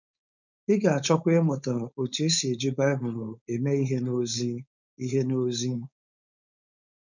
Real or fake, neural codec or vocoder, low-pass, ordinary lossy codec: fake; codec, 16 kHz, 4.8 kbps, FACodec; 7.2 kHz; none